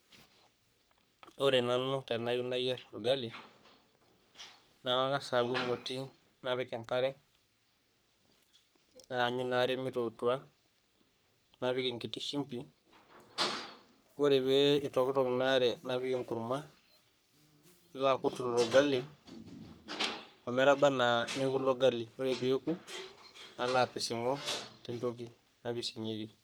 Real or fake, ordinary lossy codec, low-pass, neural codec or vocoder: fake; none; none; codec, 44.1 kHz, 3.4 kbps, Pupu-Codec